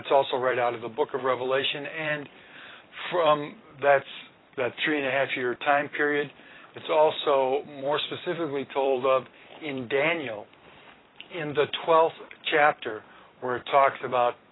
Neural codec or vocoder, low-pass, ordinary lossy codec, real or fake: vocoder, 44.1 kHz, 128 mel bands every 256 samples, BigVGAN v2; 7.2 kHz; AAC, 16 kbps; fake